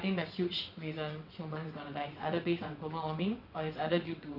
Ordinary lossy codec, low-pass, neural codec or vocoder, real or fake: none; 5.4 kHz; vocoder, 22.05 kHz, 80 mel bands, WaveNeXt; fake